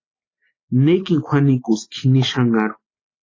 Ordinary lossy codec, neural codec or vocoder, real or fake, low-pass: AAC, 32 kbps; none; real; 7.2 kHz